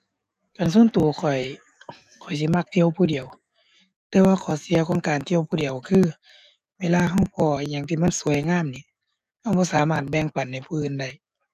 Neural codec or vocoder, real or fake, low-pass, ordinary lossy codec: codec, 44.1 kHz, 7.8 kbps, DAC; fake; 14.4 kHz; none